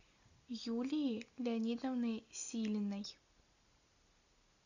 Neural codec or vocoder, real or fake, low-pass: none; real; 7.2 kHz